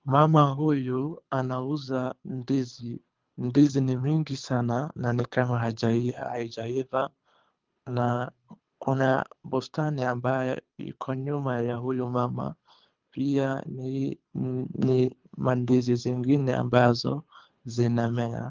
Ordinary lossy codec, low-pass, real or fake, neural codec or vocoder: Opus, 24 kbps; 7.2 kHz; fake; codec, 24 kHz, 3 kbps, HILCodec